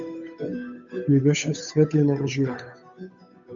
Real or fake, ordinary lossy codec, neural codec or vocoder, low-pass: fake; MP3, 64 kbps; codec, 16 kHz, 8 kbps, FunCodec, trained on Chinese and English, 25 frames a second; 7.2 kHz